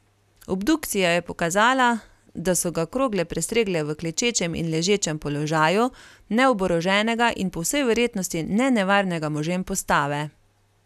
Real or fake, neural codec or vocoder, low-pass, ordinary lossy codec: real; none; 14.4 kHz; none